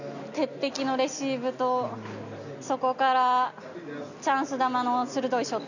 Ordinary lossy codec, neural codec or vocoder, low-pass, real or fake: none; none; 7.2 kHz; real